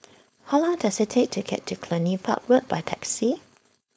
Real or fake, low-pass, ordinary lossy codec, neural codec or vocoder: fake; none; none; codec, 16 kHz, 4.8 kbps, FACodec